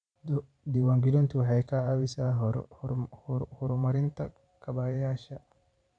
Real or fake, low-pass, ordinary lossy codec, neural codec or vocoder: fake; 9.9 kHz; none; vocoder, 44.1 kHz, 128 mel bands every 512 samples, BigVGAN v2